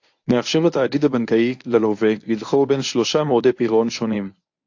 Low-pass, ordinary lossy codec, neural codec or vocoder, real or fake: 7.2 kHz; AAC, 48 kbps; codec, 24 kHz, 0.9 kbps, WavTokenizer, medium speech release version 1; fake